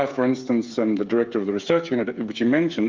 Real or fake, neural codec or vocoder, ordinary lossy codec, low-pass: fake; codec, 16 kHz, 8 kbps, FreqCodec, smaller model; Opus, 24 kbps; 7.2 kHz